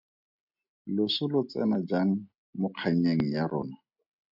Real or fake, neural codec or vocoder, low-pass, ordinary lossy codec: real; none; 5.4 kHz; MP3, 48 kbps